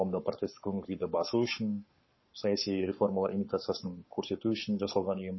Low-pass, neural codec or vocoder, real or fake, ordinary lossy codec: 7.2 kHz; codec, 44.1 kHz, 7.8 kbps, Pupu-Codec; fake; MP3, 24 kbps